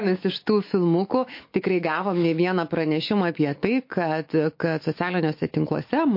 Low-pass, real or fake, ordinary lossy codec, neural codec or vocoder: 5.4 kHz; fake; MP3, 32 kbps; autoencoder, 48 kHz, 128 numbers a frame, DAC-VAE, trained on Japanese speech